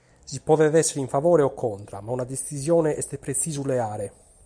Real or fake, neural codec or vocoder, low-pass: real; none; 9.9 kHz